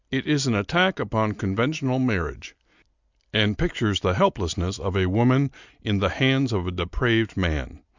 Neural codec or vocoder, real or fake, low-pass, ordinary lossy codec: none; real; 7.2 kHz; Opus, 64 kbps